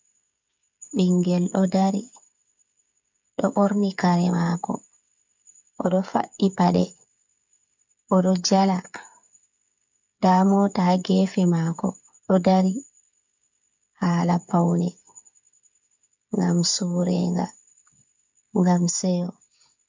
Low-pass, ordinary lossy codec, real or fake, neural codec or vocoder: 7.2 kHz; MP3, 64 kbps; fake; codec, 16 kHz, 8 kbps, FreqCodec, smaller model